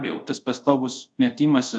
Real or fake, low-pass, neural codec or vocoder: fake; 9.9 kHz; codec, 24 kHz, 0.5 kbps, DualCodec